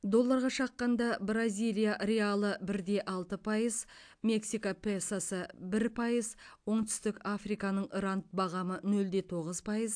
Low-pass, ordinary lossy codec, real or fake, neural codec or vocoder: 9.9 kHz; AAC, 64 kbps; real; none